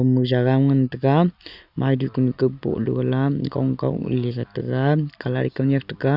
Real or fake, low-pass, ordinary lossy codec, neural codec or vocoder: real; 5.4 kHz; Opus, 64 kbps; none